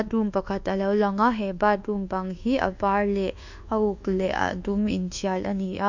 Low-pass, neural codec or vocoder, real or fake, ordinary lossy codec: 7.2 kHz; codec, 24 kHz, 1.2 kbps, DualCodec; fake; none